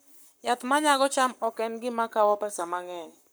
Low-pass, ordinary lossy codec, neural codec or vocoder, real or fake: none; none; codec, 44.1 kHz, 7.8 kbps, Pupu-Codec; fake